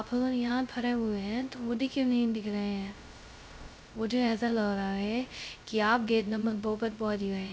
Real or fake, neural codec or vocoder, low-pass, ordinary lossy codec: fake; codec, 16 kHz, 0.2 kbps, FocalCodec; none; none